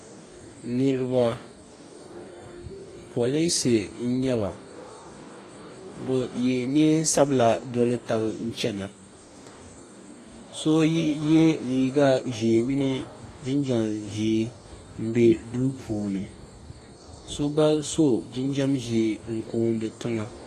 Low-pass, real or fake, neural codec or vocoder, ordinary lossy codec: 14.4 kHz; fake; codec, 44.1 kHz, 2.6 kbps, DAC; AAC, 48 kbps